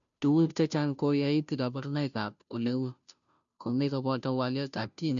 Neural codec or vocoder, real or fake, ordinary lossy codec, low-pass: codec, 16 kHz, 0.5 kbps, FunCodec, trained on Chinese and English, 25 frames a second; fake; none; 7.2 kHz